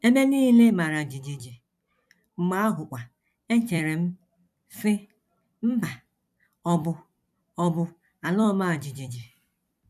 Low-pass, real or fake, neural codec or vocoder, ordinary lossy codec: 14.4 kHz; fake; vocoder, 44.1 kHz, 128 mel bands every 256 samples, BigVGAN v2; none